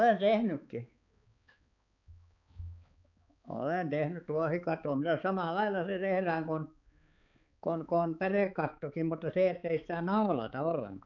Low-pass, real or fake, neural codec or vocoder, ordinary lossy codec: 7.2 kHz; fake; codec, 16 kHz, 4 kbps, X-Codec, HuBERT features, trained on balanced general audio; none